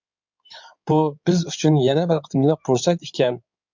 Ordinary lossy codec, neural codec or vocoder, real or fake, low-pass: MP3, 64 kbps; codec, 16 kHz in and 24 kHz out, 2.2 kbps, FireRedTTS-2 codec; fake; 7.2 kHz